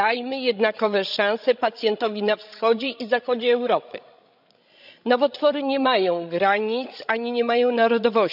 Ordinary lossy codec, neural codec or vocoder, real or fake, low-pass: none; codec, 16 kHz, 16 kbps, FreqCodec, larger model; fake; 5.4 kHz